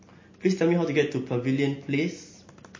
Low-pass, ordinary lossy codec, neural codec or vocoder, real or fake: 7.2 kHz; MP3, 32 kbps; none; real